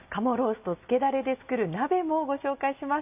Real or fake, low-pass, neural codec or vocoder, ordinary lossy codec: real; 3.6 kHz; none; none